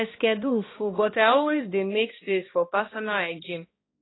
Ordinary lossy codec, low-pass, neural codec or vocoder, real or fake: AAC, 16 kbps; 7.2 kHz; codec, 16 kHz, 1 kbps, X-Codec, HuBERT features, trained on balanced general audio; fake